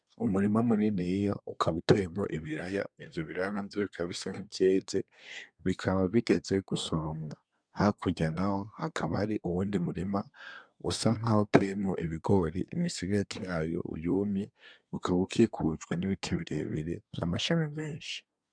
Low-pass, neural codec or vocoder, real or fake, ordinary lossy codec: 9.9 kHz; codec, 24 kHz, 1 kbps, SNAC; fake; MP3, 96 kbps